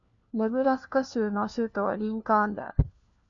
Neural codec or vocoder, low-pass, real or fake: codec, 16 kHz, 1 kbps, FunCodec, trained on LibriTTS, 50 frames a second; 7.2 kHz; fake